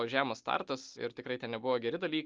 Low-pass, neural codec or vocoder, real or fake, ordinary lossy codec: 7.2 kHz; none; real; Opus, 32 kbps